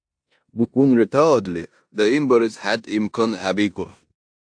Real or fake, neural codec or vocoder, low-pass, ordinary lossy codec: fake; codec, 16 kHz in and 24 kHz out, 0.9 kbps, LongCat-Audio-Codec, four codebook decoder; 9.9 kHz; AAC, 64 kbps